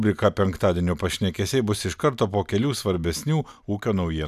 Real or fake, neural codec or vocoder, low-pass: real; none; 14.4 kHz